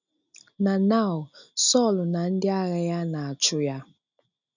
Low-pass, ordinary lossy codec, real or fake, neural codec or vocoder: 7.2 kHz; none; real; none